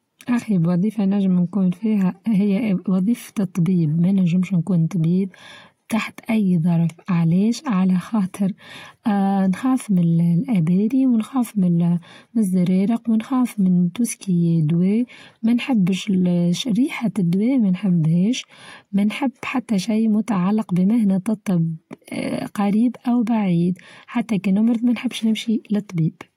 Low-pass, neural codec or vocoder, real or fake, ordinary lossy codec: 19.8 kHz; none; real; AAC, 48 kbps